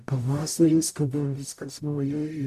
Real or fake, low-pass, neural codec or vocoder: fake; 14.4 kHz; codec, 44.1 kHz, 0.9 kbps, DAC